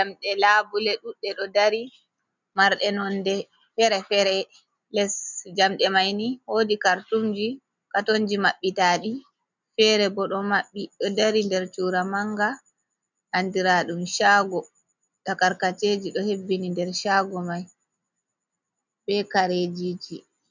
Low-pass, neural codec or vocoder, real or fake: 7.2 kHz; none; real